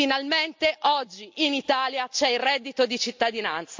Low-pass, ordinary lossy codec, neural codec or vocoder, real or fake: 7.2 kHz; MP3, 48 kbps; none; real